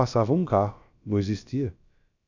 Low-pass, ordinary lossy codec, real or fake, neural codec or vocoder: 7.2 kHz; none; fake; codec, 16 kHz, about 1 kbps, DyCAST, with the encoder's durations